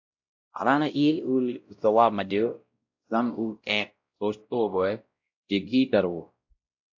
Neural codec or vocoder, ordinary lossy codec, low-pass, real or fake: codec, 16 kHz, 0.5 kbps, X-Codec, WavLM features, trained on Multilingual LibriSpeech; AAC, 48 kbps; 7.2 kHz; fake